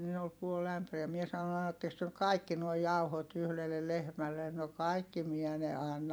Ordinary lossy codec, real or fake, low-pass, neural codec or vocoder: none; fake; none; vocoder, 44.1 kHz, 128 mel bands every 256 samples, BigVGAN v2